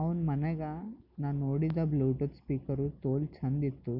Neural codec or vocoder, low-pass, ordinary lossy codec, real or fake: none; 5.4 kHz; none; real